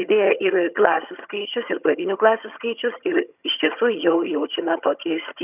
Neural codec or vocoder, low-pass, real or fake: vocoder, 22.05 kHz, 80 mel bands, HiFi-GAN; 3.6 kHz; fake